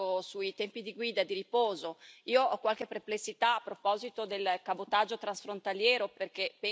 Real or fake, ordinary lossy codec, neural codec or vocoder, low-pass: real; none; none; none